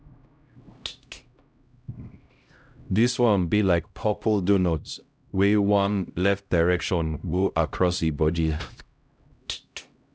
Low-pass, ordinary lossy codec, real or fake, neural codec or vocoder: none; none; fake; codec, 16 kHz, 0.5 kbps, X-Codec, HuBERT features, trained on LibriSpeech